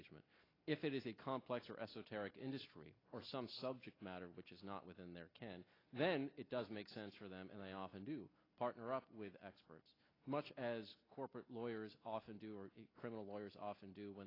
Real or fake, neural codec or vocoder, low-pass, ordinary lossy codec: real; none; 5.4 kHz; AAC, 24 kbps